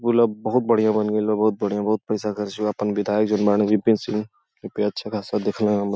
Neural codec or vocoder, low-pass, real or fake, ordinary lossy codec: none; none; real; none